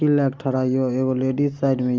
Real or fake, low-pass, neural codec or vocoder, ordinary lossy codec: real; 7.2 kHz; none; Opus, 32 kbps